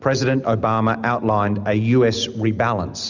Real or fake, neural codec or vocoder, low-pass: real; none; 7.2 kHz